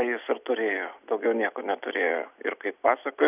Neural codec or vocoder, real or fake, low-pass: none; real; 3.6 kHz